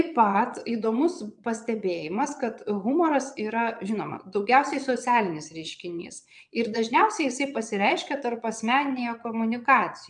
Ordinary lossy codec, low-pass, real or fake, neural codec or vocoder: MP3, 96 kbps; 9.9 kHz; fake; vocoder, 22.05 kHz, 80 mel bands, Vocos